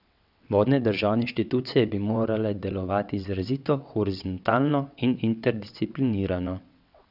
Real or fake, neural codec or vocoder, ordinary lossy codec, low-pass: fake; vocoder, 22.05 kHz, 80 mel bands, WaveNeXt; none; 5.4 kHz